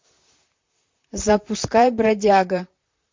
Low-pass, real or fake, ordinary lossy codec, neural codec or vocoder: 7.2 kHz; fake; MP3, 48 kbps; vocoder, 44.1 kHz, 128 mel bands, Pupu-Vocoder